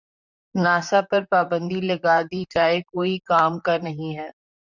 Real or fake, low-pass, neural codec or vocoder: fake; 7.2 kHz; vocoder, 44.1 kHz, 128 mel bands, Pupu-Vocoder